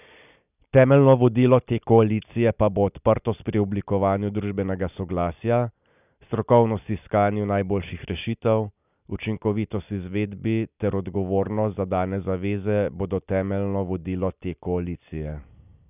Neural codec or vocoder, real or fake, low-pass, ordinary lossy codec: none; real; 3.6 kHz; none